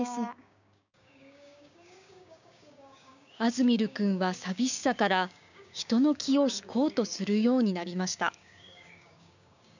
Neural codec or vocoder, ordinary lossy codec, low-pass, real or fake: codec, 16 kHz, 6 kbps, DAC; none; 7.2 kHz; fake